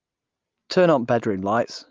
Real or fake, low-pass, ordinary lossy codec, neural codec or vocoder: real; 7.2 kHz; Opus, 32 kbps; none